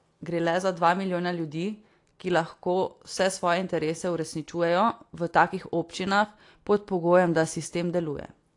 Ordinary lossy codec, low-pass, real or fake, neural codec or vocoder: AAC, 48 kbps; 10.8 kHz; real; none